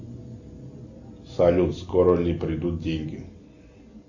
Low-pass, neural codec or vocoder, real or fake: 7.2 kHz; none; real